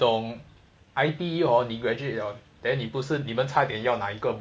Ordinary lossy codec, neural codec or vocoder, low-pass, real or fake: none; none; none; real